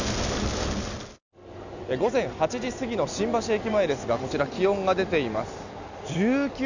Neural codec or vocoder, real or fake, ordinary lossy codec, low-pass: none; real; none; 7.2 kHz